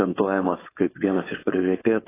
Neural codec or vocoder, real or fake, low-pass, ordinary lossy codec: codec, 16 kHz, 4.8 kbps, FACodec; fake; 3.6 kHz; AAC, 16 kbps